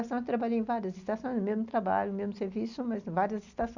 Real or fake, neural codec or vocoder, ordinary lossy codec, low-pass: real; none; none; 7.2 kHz